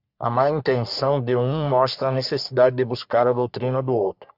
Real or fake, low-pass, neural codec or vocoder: fake; 5.4 kHz; codec, 44.1 kHz, 3.4 kbps, Pupu-Codec